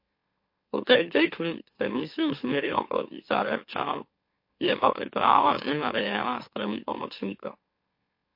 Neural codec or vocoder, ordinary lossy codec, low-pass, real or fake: autoencoder, 44.1 kHz, a latent of 192 numbers a frame, MeloTTS; MP3, 32 kbps; 5.4 kHz; fake